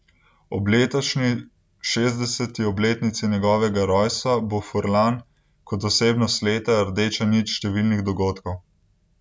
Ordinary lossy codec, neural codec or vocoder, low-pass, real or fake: none; none; none; real